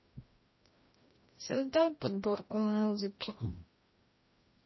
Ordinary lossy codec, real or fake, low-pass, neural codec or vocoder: MP3, 24 kbps; fake; 7.2 kHz; codec, 16 kHz, 1 kbps, FreqCodec, larger model